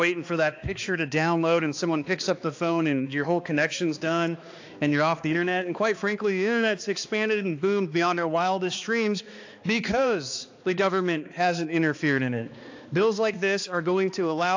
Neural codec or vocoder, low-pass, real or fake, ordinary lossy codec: codec, 16 kHz, 2 kbps, X-Codec, HuBERT features, trained on balanced general audio; 7.2 kHz; fake; AAC, 48 kbps